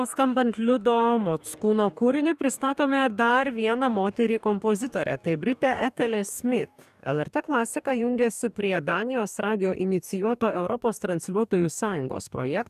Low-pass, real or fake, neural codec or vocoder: 14.4 kHz; fake; codec, 44.1 kHz, 2.6 kbps, DAC